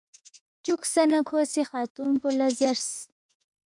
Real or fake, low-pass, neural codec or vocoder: fake; 10.8 kHz; autoencoder, 48 kHz, 32 numbers a frame, DAC-VAE, trained on Japanese speech